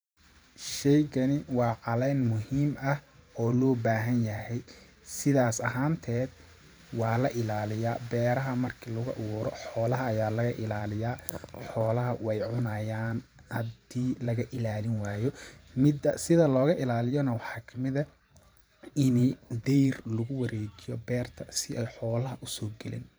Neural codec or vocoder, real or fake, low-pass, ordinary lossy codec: vocoder, 44.1 kHz, 128 mel bands every 512 samples, BigVGAN v2; fake; none; none